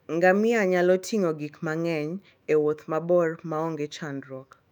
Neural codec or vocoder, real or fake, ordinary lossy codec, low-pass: autoencoder, 48 kHz, 128 numbers a frame, DAC-VAE, trained on Japanese speech; fake; none; 19.8 kHz